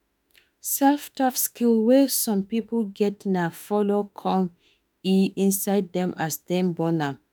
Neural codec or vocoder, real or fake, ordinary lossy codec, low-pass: autoencoder, 48 kHz, 32 numbers a frame, DAC-VAE, trained on Japanese speech; fake; none; none